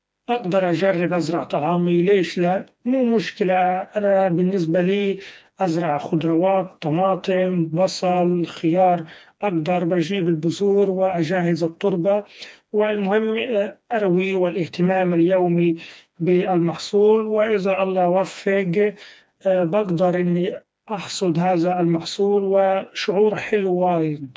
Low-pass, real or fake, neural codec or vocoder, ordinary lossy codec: none; fake; codec, 16 kHz, 2 kbps, FreqCodec, smaller model; none